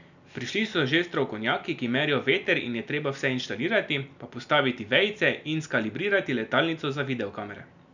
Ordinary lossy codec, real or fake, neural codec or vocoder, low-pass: none; real; none; 7.2 kHz